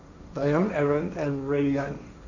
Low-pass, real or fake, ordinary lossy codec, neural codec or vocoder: 7.2 kHz; fake; none; codec, 16 kHz, 1.1 kbps, Voila-Tokenizer